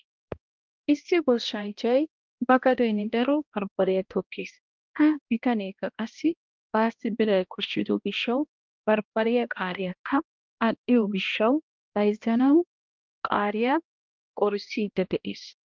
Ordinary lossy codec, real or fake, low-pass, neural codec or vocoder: Opus, 24 kbps; fake; 7.2 kHz; codec, 16 kHz, 1 kbps, X-Codec, HuBERT features, trained on balanced general audio